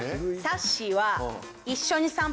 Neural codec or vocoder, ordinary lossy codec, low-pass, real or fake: none; none; none; real